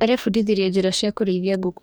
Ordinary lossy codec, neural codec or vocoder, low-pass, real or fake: none; codec, 44.1 kHz, 2.6 kbps, DAC; none; fake